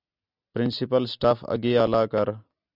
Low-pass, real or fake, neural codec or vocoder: 5.4 kHz; real; none